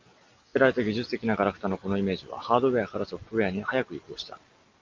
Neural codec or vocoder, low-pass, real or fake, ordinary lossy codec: none; 7.2 kHz; real; Opus, 32 kbps